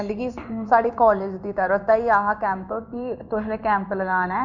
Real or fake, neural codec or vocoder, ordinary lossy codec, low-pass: fake; codec, 16 kHz in and 24 kHz out, 1 kbps, XY-Tokenizer; none; 7.2 kHz